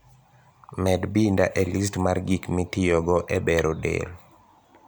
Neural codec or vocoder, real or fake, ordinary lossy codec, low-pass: vocoder, 44.1 kHz, 128 mel bands every 256 samples, BigVGAN v2; fake; none; none